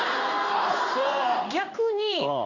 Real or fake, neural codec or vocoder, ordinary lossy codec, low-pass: fake; codec, 16 kHz in and 24 kHz out, 1 kbps, XY-Tokenizer; none; 7.2 kHz